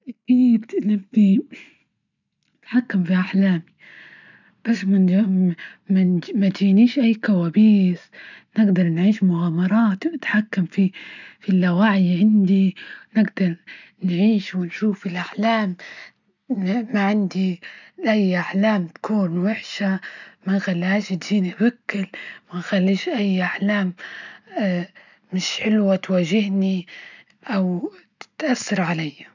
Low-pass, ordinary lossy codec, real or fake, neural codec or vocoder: 7.2 kHz; none; real; none